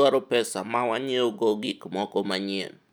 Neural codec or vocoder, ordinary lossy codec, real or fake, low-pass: none; none; real; 19.8 kHz